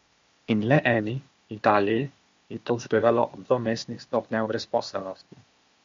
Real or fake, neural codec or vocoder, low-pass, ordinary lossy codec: fake; codec, 16 kHz, 0.8 kbps, ZipCodec; 7.2 kHz; MP3, 48 kbps